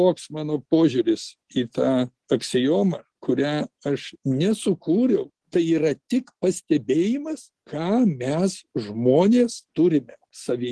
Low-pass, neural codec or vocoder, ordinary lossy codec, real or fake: 10.8 kHz; none; Opus, 16 kbps; real